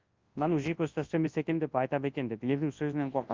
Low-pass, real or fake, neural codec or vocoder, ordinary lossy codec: 7.2 kHz; fake; codec, 24 kHz, 0.9 kbps, WavTokenizer, large speech release; Opus, 32 kbps